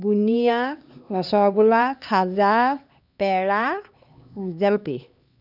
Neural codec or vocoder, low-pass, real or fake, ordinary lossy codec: codec, 16 kHz, 2 kbps, X-Codec, HuBERT features, trained on LibriSpeech; 5.4 kHz; fake; none